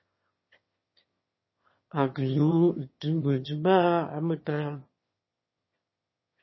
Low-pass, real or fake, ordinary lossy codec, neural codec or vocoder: 7.2 kHz; fake; MP3, 24 kbps; autoencoder, 22.05 kHz, a latent of 192 numbers a frame, VITS, trained on one speaker